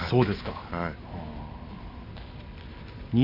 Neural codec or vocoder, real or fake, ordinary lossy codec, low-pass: none; real; none; 5.4 kHz